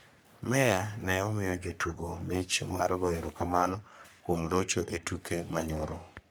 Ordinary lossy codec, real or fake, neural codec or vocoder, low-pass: none; fake; codec, 44.1 kHz, 3.4 kbps, Pupu-Codec; none